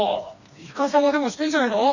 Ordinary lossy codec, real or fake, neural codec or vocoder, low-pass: none; fake; codec, 16 kHz, 2 kbps, FreqCodec, smaller model; 7.2 kHz